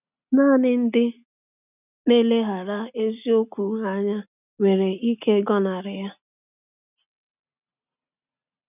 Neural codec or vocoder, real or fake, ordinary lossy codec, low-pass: none; real; none; 3.6 kHz